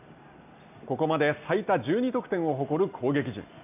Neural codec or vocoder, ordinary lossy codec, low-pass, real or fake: none; none; 3.6 kHz; real